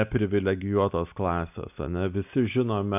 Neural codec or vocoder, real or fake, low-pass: none; real; 3.6 kHz